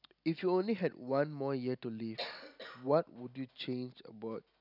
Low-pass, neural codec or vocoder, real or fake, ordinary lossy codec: 5.4 kHz; none; real; none